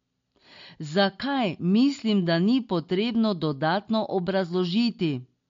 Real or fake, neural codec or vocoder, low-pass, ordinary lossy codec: real; none; 7.2 kHz; MP3, 48 kbps